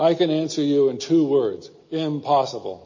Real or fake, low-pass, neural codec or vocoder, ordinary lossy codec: real; 7.2 kHz; none; MP3, 32 kbps